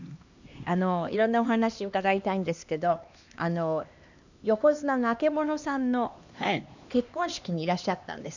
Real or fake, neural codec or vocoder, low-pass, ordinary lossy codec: fake; codec, 16 kHz, 2 kbps, X-Codec, HuBERT features, trained on LibriSpeech; 7.2 kHz; none